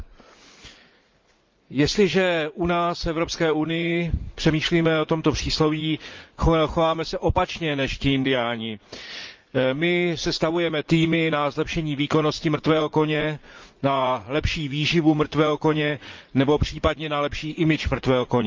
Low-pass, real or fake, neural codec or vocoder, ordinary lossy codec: 7.2 kHz; fake; vocoder, 44.1 kHz, 80 mel bands, Vocos; Opus, 24 kbps